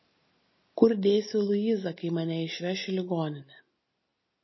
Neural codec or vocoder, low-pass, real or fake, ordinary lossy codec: none; 7.2 kHz; real; MP3, 24 kbps